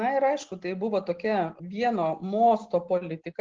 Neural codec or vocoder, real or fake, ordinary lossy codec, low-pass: none; real; Opus, 16 kbps; 7.2 kHz